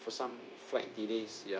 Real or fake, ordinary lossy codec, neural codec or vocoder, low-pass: fake; none; codec, 16 kHz, 0.9 kbps, LongCat-Audio-Codec; none